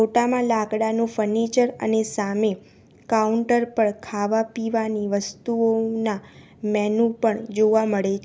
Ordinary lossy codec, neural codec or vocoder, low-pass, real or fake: none; none; none; real